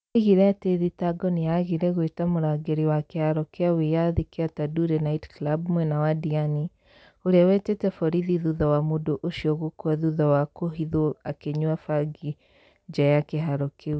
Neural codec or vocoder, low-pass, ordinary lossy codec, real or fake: none; none; none; real